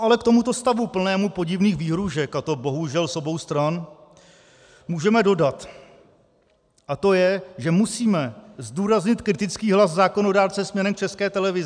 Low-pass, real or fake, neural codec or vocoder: 9.9 kHz; real; none